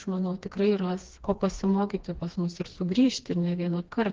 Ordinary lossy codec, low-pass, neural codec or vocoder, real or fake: Opus, 16 kbps; 7.2 kHz; codec, 16 kHz, 2 kbps, FreqCodec, smaller model; fake